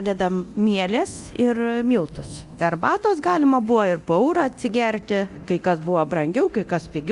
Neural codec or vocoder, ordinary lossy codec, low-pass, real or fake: codec, 24 kHz, 0.9 kbps, DualCodec; Opus, 64 kbps; 10.8 kHz; fake